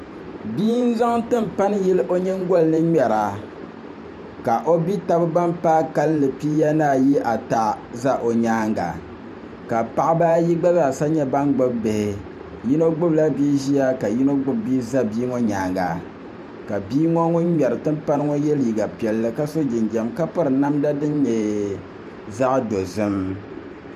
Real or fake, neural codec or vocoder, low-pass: fake; vocoder, 44.1 kHz, 128 mel bands every 512 samples, BigVGAN v2; 14.4 kHz